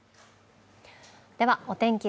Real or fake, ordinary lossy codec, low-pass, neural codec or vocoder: real; none; none; none